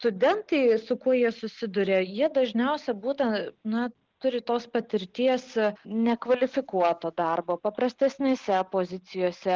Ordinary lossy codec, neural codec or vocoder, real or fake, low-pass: Opus, 32 kbps; none; real; 7.2 kHz